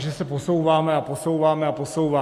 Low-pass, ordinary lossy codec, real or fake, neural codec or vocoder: 14.4 kHz; AAC, 64 kbps; real; none